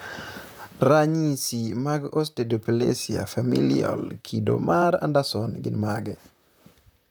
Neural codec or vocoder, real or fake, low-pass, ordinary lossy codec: vocoder, 44.1 kHz, 128 mel bands, Pupu-Vocoder; fake; none; none